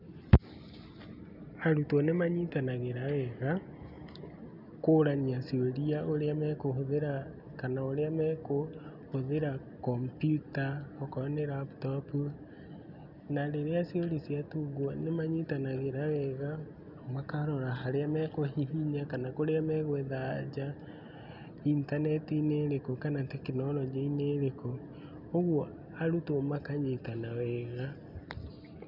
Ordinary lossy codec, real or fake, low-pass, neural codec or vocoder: none; real; 5.4 kHz; none